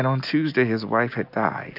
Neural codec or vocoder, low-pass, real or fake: none; 5.4 kHz; real